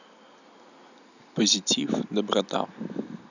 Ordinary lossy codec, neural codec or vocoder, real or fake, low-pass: none; none; real; 7.2 kHz